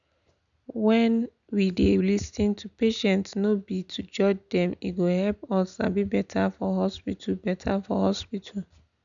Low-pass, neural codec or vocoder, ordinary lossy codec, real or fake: 7.2 kHz; none; none; real